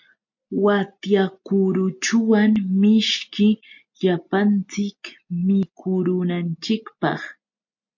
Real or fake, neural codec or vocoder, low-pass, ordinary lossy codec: real; none; 7.2 kHz; MP3, 48 kbps